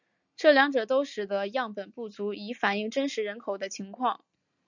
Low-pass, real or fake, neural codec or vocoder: 7.2 kHz; real; none